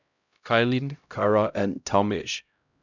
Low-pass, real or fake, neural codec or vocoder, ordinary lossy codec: 7.2 kHz; fake; codec, 16 kHz, 0.5 kbps, X-Codec, HuBERT features, trained on LibriSpeech; none